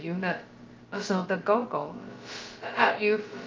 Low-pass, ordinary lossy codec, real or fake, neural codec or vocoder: 7.2 kHz; Opus, 24 kbps; fake; codec, 16 kHz, about 1 kbps, DyCAST, with the encoder's durations